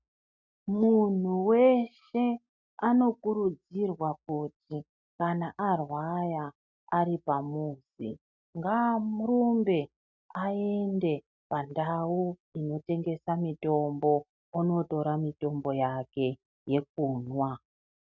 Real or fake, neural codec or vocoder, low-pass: real; none; 7.2 kHz